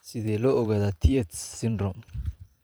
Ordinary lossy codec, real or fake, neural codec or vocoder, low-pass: none; fake; vocoder, 44.1 kHz, 128 mel bands every 512 samples, BigVGAN v2; none